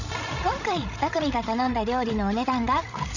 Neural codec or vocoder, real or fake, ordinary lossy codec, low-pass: codec, 16 kHz, 16 kbps, FreqCodec, larger model; fake; none; 7.2 kHz